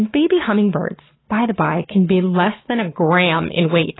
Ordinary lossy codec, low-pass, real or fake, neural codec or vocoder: AAC, 16 kbps; 7.2 kHz; fake; codec, 44.1 kHz, 7.8 kbps, Pupu-Codec